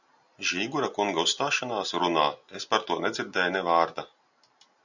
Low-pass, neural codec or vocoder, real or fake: 7.2 kHz; none; real